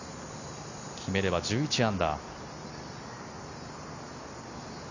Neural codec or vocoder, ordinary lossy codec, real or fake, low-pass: none; none; real; 7.2 kHz